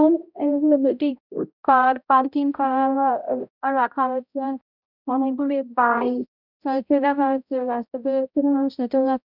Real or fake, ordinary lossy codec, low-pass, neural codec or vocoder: fake; none; 5.4 kHz; codec, 16 kHz, 0.5 kbps, X-Codec, HuBERT features, trained on balanced general audio